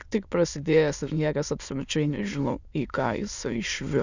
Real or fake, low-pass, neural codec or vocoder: fake; 7.2 kHz; autoencoder, 22.05 kHz, a latent of 192 numbers a frame, VITS, trained on many speakers